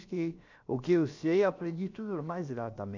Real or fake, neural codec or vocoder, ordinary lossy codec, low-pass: fake; codec, 16 kHz, about 1 kbps, DyCAST, with the encoder's durations; Opus, 64 kbps; 7.2 kHz